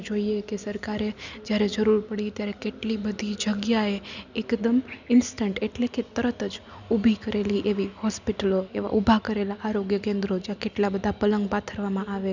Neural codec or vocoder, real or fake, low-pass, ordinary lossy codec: none; real; 7.2 kHz; none